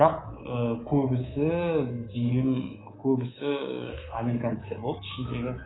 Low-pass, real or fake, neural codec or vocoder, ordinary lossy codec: 7.2 kHz; fake; codec, 24 kHz, 3.1 kbps, DualCodec; AAC, 16 kbps